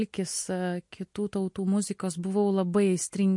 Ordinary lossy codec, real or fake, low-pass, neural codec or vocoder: MP3, 48 kbps; real; 10.8 kHz; none